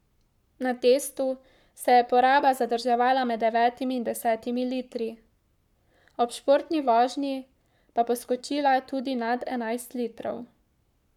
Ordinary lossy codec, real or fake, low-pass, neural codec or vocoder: none; fake; 19.8 kHz; codec, 44.1 kHz, 7.8 kbps, Pupu-Codec